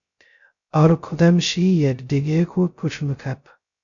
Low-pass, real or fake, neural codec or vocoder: 7.2 kHz; fake; codec, 16 kHz, 0.2 kbps, FocalCodec